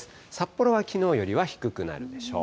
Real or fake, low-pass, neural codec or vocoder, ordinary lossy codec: real; none; none; none